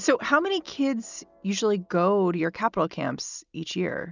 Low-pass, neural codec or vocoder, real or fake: 7.2 kHz; none; real